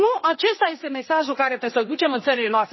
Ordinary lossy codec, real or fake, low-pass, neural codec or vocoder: MP3, 24 kbps; fake; 7.2 kHz; codec, 16 kHz, 2 kbps, X-Codec, HuBERT features, trained on general audio